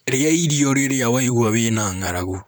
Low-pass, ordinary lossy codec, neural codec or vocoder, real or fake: none; none; vocoder, 44.1 kHz, 128 mel bands, Pupu-Vocoder; fake